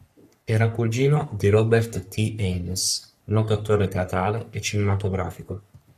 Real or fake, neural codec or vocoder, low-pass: fake; codec, 44.1 kHz, 3.4 kbps, Pupu-Codec; 14.4 kHz